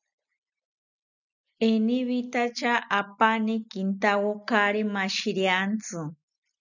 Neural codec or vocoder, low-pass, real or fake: none; 7.2 kHz; real